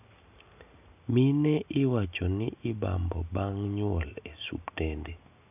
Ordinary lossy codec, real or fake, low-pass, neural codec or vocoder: none; real; 3.6 kHz; none